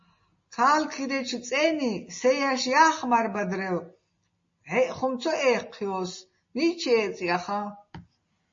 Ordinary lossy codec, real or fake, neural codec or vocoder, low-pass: MP3, 32 kbps; real; none; 7.2 kHz